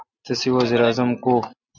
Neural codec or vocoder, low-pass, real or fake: none; 7.2 kHz; real